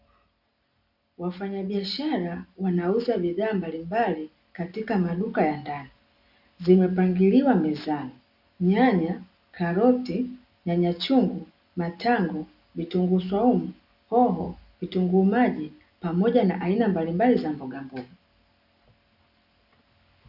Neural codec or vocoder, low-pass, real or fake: none; 5.4 kHz; real